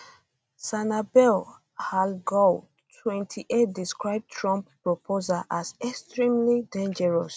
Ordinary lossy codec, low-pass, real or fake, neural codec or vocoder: none; none; real; none